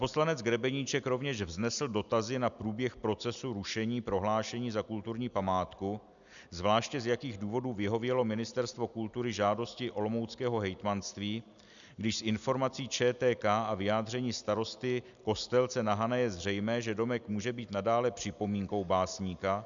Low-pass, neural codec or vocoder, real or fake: 7.2 kHz; none; real